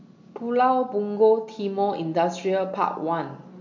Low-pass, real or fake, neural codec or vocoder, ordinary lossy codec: 7.2 kHz; real; none; MP3, 48 kbps